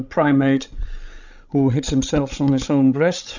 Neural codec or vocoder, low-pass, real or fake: codec, 16 kHz, 16 kbps, FreqCodec, larger model; 7.2 kHz; fake